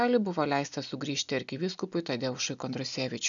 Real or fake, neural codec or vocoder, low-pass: real; none; 7.2 kHz